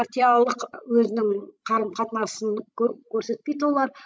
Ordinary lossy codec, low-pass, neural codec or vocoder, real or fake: none; none; codec, 16 kHz, 16 kbps, FreqCodec, larger model; fake